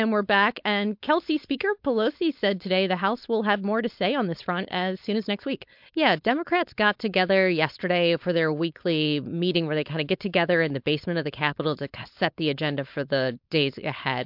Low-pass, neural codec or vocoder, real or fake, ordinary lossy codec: 5.4 kHz; codec, 16 kHz, 4.8 kbps, FACodec; fake; MP3, 48 kbps